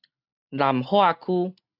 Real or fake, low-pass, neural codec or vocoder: real; 5.4 kHz; none